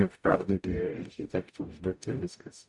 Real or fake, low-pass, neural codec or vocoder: fake; 10.8 kHz; codec, 44.1 kHz, 0.9 kbps, DAC